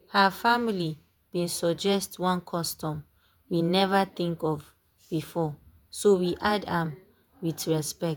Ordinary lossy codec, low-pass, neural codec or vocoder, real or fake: none; none; vocoder, 48 kHz, 128 mel bands, Vocos; fake